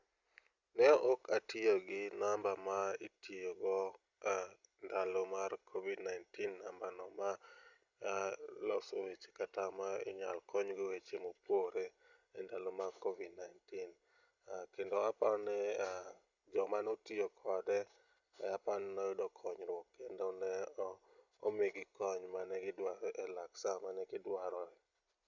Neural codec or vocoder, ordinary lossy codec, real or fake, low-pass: none; Opus, 64 kbps; real; 7.2 kHz